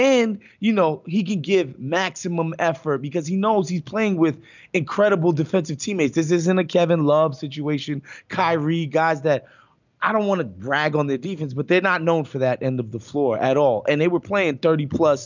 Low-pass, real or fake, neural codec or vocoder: 7.2 kHz; real; none